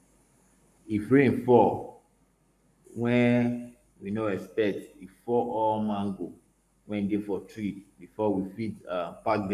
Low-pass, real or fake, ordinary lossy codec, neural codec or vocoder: 14.4 kHz; fake; none; codec, 44.1 kHz, 7.8 kbps, Pupu-Codec